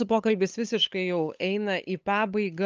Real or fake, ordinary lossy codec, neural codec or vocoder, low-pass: fake; Opus, 24 kbps; codec, 16 kHz, 8 kbps, FunCodec, trained on LibriTTS, 25 frames a second; 7.2 kHz